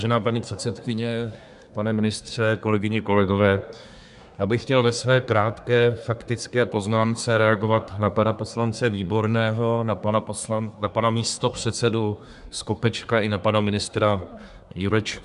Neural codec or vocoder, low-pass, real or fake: codec, 24 kHz, 1 kbps, SNAC; 10.8 kHz; fake